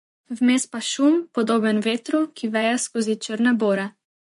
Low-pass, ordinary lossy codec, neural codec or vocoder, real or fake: 10.8 kHz; MP3, 48 kbps; vocoder, 24 kHz, 100 mel bands, Vocos; fake